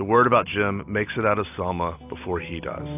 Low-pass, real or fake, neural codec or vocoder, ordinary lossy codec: 3.6 kHz; real; none; AAC, 32 kbps